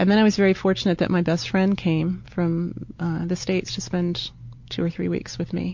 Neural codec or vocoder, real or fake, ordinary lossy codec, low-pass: none; real; MP3, 48 kbps; 7.2 kHz